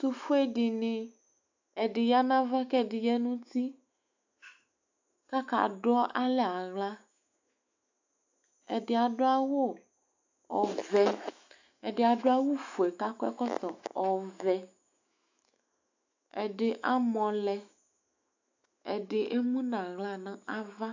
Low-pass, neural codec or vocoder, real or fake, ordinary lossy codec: 7.2 kHz; autoencoder, 48 kHz, 128 numbers a frame, DAC-VAE, trained on Japanese speech; fake; AAC, 48 kbps